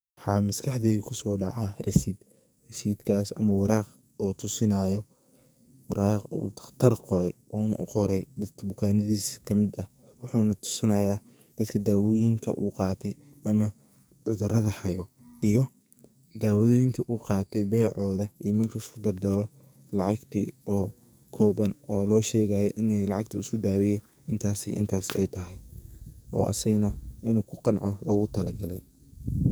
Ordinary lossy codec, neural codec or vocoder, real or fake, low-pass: none; codec, 44.1 kHz, 2.6 kbps, SNAC; fake; none